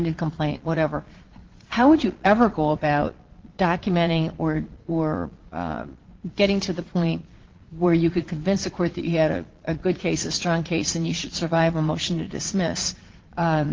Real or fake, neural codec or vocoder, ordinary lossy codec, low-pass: fake; vocoder, 22.05 kHz, 80 mel bands, Vocos; Opus, 16 kbps; 7.2 kHz